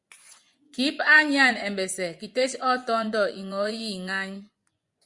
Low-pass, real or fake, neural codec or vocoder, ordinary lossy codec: 10.8 kHz; fake; vocoder, 24 kHz, 100 mel bands, Vocos; Opus, 64 kbps